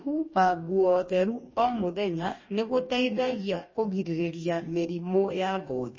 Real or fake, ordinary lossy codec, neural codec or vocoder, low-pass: fake; MP3, 32 kbps; codec, 44.1 kHz, 2.6 kbps, DAC; 7.2 kHz